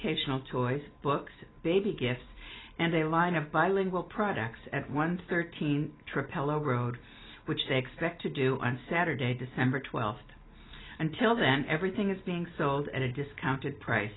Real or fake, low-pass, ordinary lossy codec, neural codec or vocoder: real; 7.2 kHz; AAC, 16 kbps; none